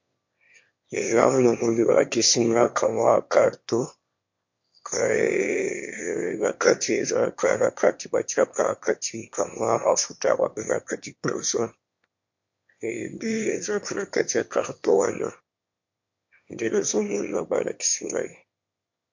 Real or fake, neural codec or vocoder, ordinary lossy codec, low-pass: fake; autoencoder, 22.05 kHz, a latent of 192 numbers a frame, VITS, trained on one speaker; MP3, 48 kbps; 7.2 kHz